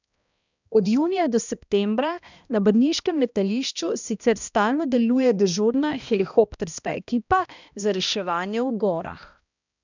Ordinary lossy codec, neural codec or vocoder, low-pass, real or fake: none; codec, 16 kHz, 1 kbps, X-Codec, HuBERT features, trained on balanced general audio; 7.2 kHz; fake